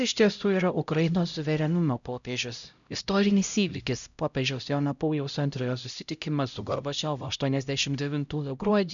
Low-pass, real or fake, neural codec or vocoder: 7.2 kHz; fake; codec, 16 kHz, 0.5 kbps, X-Codec, HuBERT features, trained on LibriSpeech